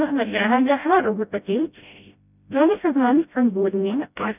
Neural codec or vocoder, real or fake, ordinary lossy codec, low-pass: codec, 16 kHz, 0.5 kbps, FreqCodec, smaller model; fake; none; 3.6 kHz